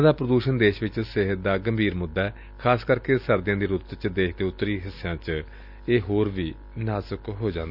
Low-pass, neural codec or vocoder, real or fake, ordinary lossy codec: 5.4 kHz; none; real; none